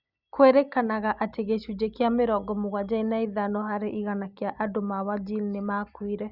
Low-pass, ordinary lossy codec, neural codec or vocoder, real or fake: 5.4 kHz; Opus, 64 kbps; none; real